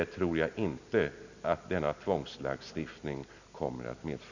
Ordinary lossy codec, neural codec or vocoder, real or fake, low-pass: none; none; real; 7.2 kHz